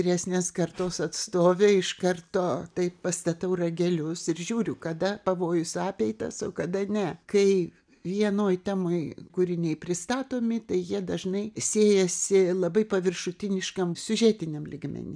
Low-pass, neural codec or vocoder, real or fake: 9.9 kHz; none; real